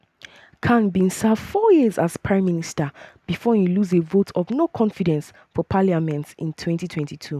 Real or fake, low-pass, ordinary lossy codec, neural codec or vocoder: real; 14.4 kHz; none; none